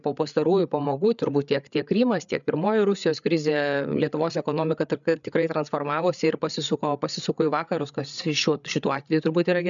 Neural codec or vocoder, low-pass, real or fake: codec, 16 kHz, 8 kbps, FreqCodec, larger model; 7.2 kHz; fake